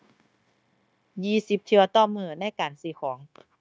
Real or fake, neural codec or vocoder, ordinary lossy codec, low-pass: fake; codec, 16 kHz, 0.9 kbps, LongCat-Audio-Codec; none; none